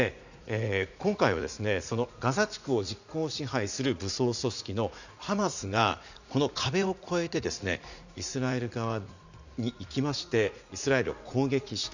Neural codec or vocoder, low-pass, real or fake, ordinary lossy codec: vocoder, 44.1 kHz, 80 mel bands, Vocos; 7.2 kHz; fake; none